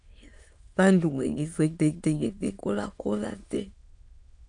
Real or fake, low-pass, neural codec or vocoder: fake; 9.9 kHz; autoencoder, 22.05 kHz, a latent of 192 numbers a frame, VITS, trained on many speakers